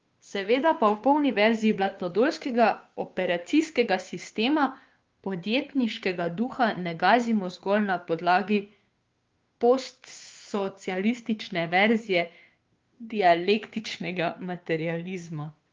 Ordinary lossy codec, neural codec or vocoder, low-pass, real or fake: Opus, 32 kbps; codec, 16 kHz, 2 kbps, FunCodec, trained on Chinese and English, 25 frames a second; 7.2 kHz; fake